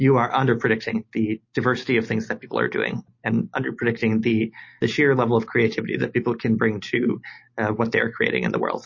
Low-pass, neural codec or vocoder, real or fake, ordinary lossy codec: 7.2 kHz; none; real; MP3, 32 kbps